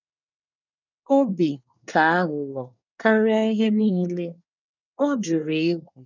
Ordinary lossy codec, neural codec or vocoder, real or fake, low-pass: none; codec, 24 kHz, 1 kbps, SNAC; fake; 7.2 kHz